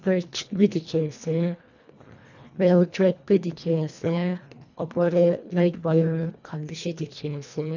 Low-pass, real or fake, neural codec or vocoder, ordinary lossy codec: 7.2 kHz; fake; codec, 24 kHz, 1.5 kbps, HILCodec; none